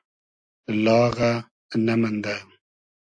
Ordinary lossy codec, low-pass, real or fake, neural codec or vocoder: AAC, 64 kbps; 9.9 kHz; real; none